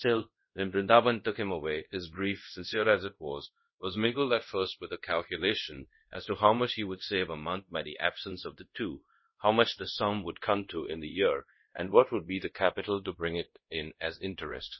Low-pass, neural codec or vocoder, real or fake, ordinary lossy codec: 7.2 kHz; codec, 24 kHz, 0.5 kbps, DualCodec; fake; MP3, 24 kbps